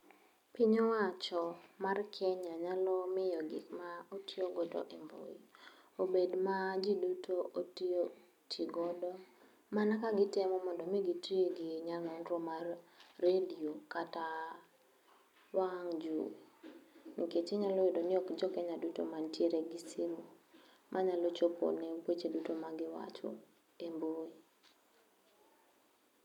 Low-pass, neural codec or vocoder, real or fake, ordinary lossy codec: 19.8 kHz; none; real; none